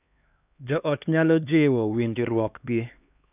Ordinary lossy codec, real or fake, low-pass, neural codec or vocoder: none; fake; 3.6 kHz; codec, 16 kHz, 2 kbps, X-Codec, HuBERT features, trained on LibriSpeech